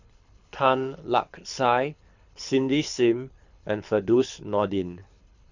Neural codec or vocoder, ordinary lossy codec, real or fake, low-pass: codec, 24 kHz, 6 kbps, HILCodec; none; fake; 7.2 kHz